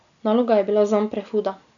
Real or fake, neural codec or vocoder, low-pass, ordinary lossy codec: real; none; 7.2 kHz; none